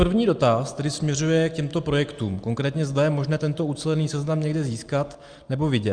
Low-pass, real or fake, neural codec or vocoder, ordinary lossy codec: 9.9 kHz; real; none; Opus, 32 kbps